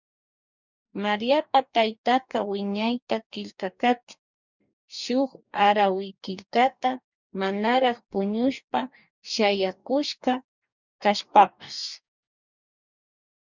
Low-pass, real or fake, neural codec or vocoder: 7.2 kHz; fake; codec, 44.1 kHz, 2.6 kbps, DAC